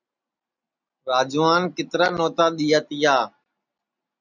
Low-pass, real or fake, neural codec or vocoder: 7.2 kHz; real; none